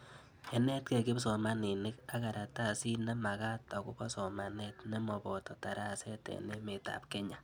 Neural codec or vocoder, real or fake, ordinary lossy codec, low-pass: none; real; none; none